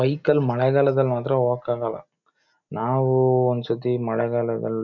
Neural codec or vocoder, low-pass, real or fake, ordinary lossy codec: none; 7.2 kHz; real; none